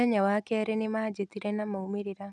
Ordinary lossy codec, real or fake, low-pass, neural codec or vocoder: none; real; none; none